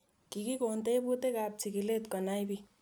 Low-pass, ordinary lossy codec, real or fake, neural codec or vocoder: none; none; real; none